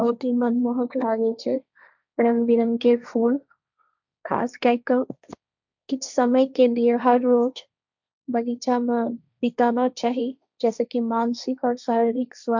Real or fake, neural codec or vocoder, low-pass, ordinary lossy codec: fake; codec, 16 kHz, 1.1 kbps, Voila-Tokenizer; 7.2 kHz; none